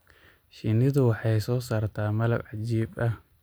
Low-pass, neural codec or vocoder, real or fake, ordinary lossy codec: none; none; real; none